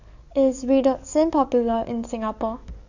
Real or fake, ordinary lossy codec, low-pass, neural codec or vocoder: fake; none; 7.2 kHz; codec, 44.1 kHz, 7.8 kbps, DAC